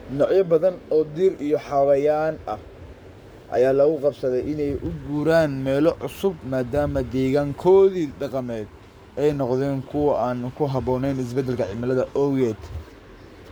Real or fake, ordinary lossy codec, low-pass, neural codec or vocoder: fake; none; none; codec, 44.1 kHz, 7.8 kbps, Pupu-Codec